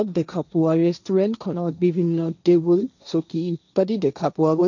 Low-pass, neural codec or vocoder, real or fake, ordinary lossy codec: 7.2 kHz; codec, 16 kHz, 1.1 kbps, Voila-Tokenizer; fake; none